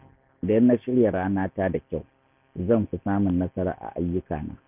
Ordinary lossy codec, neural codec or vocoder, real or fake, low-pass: none; none; real; 3.6 kHz